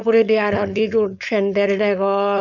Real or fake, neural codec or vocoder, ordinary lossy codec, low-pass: fake; codec, 16 kHz, 4.8 kbps, FACodec; none; 7.2 kHz